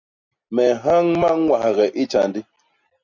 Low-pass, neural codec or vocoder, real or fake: 7.2 kHz; none; real